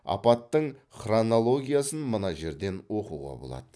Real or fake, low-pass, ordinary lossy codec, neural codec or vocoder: real; none; none; none